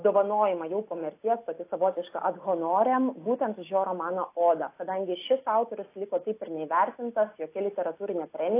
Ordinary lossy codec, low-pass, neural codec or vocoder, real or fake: AAC, 24 kbps; 3.6 kHz; none; real